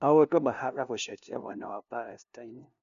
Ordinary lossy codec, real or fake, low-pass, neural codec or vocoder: none; fake; 7.2 kHz; codec, 16 kHz, 0.5 kbps, FunCodec, trained on LibriTTS, 25 frames a second